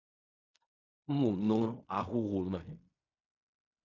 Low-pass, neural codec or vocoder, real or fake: 7.2 kHz; codec, 16 kHz in and 24 kHz out, 0.4 kbps, LongCat-Audio-Codec, fine tuned four codebook decoder; fake